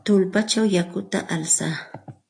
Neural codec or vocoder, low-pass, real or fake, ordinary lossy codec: none; 9.9 kHz; real; AAC, 48 kbps